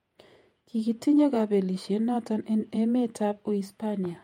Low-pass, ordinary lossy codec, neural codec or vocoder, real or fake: 19.8 kHz; MP3, 64 kbps; vocoder, 48 kHz, 128 mel bands, Vocos; fake